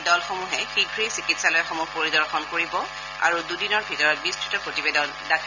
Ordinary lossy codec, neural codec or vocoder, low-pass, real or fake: none; none; 7.2 kHz; real